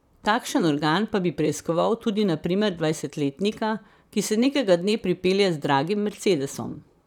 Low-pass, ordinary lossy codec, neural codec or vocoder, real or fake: 19.8 kHz; none; vocoder, 44.1 kHz, 128 mel bands, Pupu-Vocoder; fake